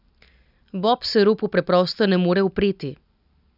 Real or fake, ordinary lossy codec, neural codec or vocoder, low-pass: real; none; none; 5.4 kHz